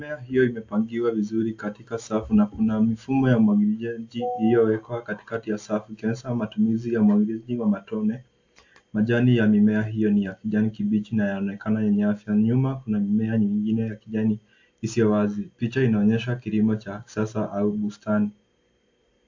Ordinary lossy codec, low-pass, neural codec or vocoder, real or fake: AAC, 48 kbps; 7.2 kHz; none; real